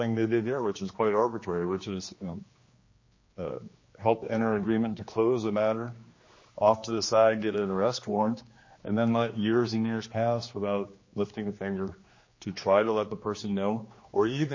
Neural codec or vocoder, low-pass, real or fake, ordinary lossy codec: codec, 16 kHz, 2 kbps, X-Codec, HuBERT features, trained on general audio; 7.2 kHz; fake; MP3, 32 kbps